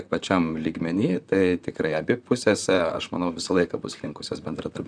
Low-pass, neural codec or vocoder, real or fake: 9.9 kHz; vocoder, 44.1 kHz, 128 mel bands, Pupu-Vocoder; fake